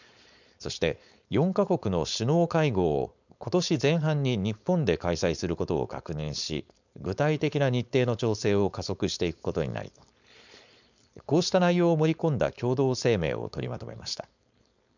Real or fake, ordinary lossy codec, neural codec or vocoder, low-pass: fake; none; codec, 16 kHz, 4.8 kbps, FACodec; 7.2 kHz